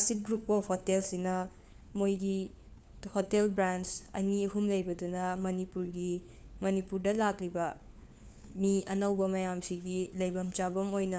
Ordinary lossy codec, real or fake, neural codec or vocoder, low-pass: none; fake; codec, 16 kHz, 4 kbps, FunCodec, trained on LibriTTS, 50 frames a second; none